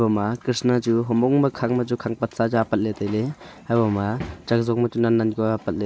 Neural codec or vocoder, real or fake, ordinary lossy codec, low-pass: none; real; none; none